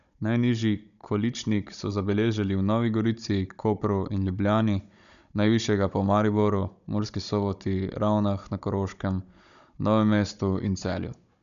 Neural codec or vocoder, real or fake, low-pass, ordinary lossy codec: codec, 16 kHz, 16 kbps, FunCodec, trained on Chinese and English, 50 frames a second; fake; 7.2 kHz; none